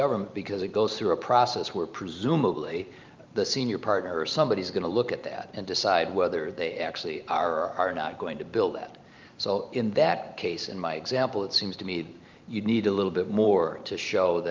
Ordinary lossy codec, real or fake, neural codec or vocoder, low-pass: Opus, 24 kbps; fake; vocoder, 44.1 kHz, 128 mel bands every 512 samples, BigVGAN v2; 7.2 kHz